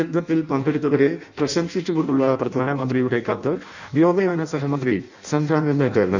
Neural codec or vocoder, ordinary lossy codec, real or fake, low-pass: codec, 16 kHz in and 24 kHz out, 0.6 kbps, FireRedTTS-2 codec; none; fake; 7.2 kHz